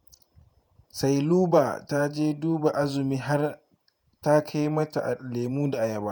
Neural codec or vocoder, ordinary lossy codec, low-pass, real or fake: vocoder, 48 kHz, 128 mel bands, Vocos; none; none; fake